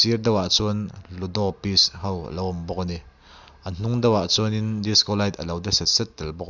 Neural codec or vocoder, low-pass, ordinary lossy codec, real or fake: none; 7.2 kHz; none; real